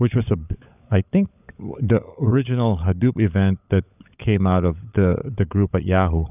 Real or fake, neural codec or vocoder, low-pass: fake; codec, 16 kHz, 16 kbps, FunCodec, trained on LibriTTS, 50 frames a second; 3.6 kHz